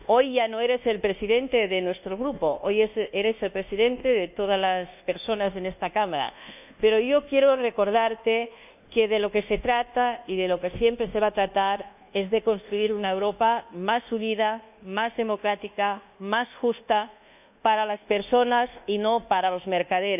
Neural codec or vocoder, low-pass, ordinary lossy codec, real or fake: codec, 24 kHz, 1.2 kbps, DualCodec; 3.6 kHz; none; fake